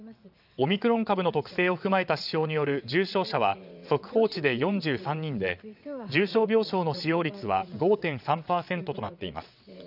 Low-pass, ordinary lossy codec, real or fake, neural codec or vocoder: 5.4 kHz; none; fake; vocoder, 22.05 kHz, 80 mel bands, WaveNeXt